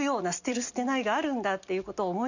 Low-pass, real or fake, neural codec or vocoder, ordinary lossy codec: 7.2 kHz; real; none; none